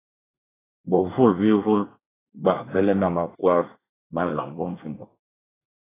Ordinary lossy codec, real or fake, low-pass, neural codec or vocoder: AAC, 16 kbps; fake; 3.6 kHz; codec, 24 kHz, 1 kbps, SNAC